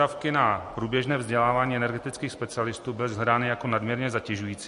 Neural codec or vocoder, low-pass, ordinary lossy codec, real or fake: none; 10.8 kHz; MP3, 48 kbps; real